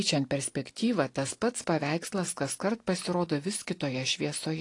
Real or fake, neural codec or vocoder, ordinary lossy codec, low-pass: real; none; AAC, 48 kbps; 10.8 kHz